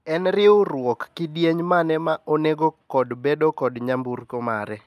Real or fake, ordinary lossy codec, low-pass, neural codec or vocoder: real; none; 14.4 kHz; none